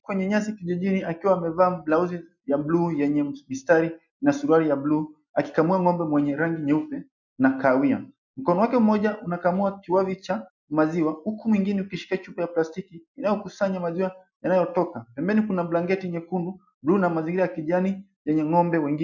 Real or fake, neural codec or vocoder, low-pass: real; none; 7.2 kHz